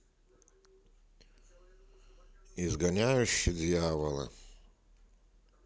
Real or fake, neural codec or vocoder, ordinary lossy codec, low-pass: real; none; none; none